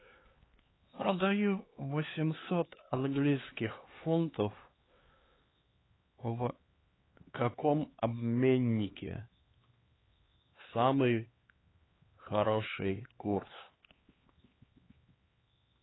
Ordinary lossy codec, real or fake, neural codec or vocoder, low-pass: AAC, 16 kbps; fake; codec, 16 kHz, 4 kbps, X-Codec, HuBERT features, trained on LibriSpeech; 7.2 kHz